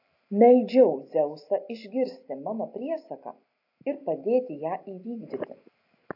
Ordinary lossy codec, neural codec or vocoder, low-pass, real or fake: AAC, 32 kbps; none; 5.4 kHz; real